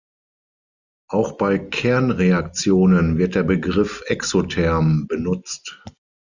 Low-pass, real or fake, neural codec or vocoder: 7.2 kHz; real; none